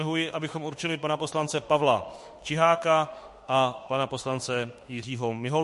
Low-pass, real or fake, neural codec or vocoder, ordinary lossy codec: 14.4 kHz; fake; autoencoder, 48 kHz, 32 numbers a frame, DAC-VAE, trained on Japanese speech; MP3, 48 kbps